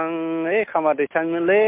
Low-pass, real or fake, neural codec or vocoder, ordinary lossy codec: 3.6 kHz; real; none; MP3, 32 kbps